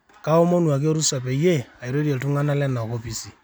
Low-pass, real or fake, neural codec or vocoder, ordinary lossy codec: none; real; none; none